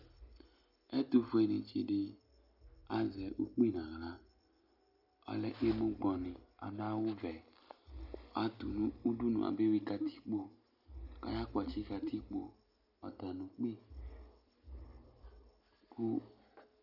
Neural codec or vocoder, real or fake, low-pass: none; real; 5.4 kHz